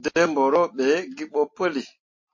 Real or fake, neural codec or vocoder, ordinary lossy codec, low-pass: real; none; MP3, 32 kbps; 7.2 kHz